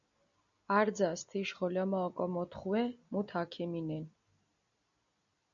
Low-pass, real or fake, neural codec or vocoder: 7.2 kHz; real; none